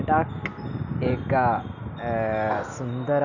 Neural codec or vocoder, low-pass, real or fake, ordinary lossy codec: none; 7.2 kHz; real; none